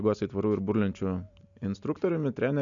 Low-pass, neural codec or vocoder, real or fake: 7.2 kHz; none; real